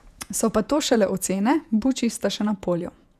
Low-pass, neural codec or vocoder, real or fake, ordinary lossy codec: 14.4 kHz; vocoder, 48 kHz, 128 mel bands, Vocos; fake; none